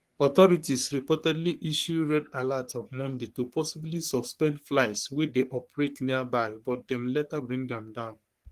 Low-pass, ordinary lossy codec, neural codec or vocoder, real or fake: 14.4 kHz; Opus, 24 kbps; codec, 44.1 kHz, 3.4 kbps, Pupu-Codec; fake